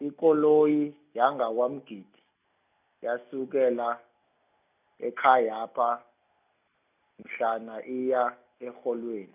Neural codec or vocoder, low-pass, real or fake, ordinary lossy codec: none; 3.6 kHz; real; none